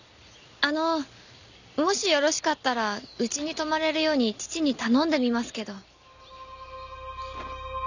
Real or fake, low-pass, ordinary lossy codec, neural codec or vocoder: real; 7.2 kHz; none; none